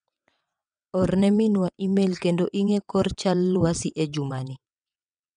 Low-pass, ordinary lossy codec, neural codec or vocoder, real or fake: 9.9 kHz; none; vocoder, 22.05 kHz, 80 mel bands, WaveNeXt; fake